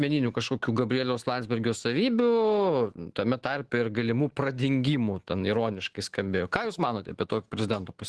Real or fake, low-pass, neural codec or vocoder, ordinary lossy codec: fake; 10.8 kHz; autoencoder, 48 kHz, 128 numbers a frame, DAC-VAE, trained on Japanese speech; Opus, 16 kbps